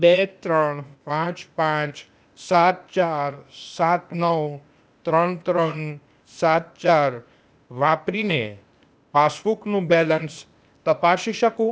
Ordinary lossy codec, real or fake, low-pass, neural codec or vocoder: none; fake; none; codec, 16 kHz, 0.8 kbps, ZipCodec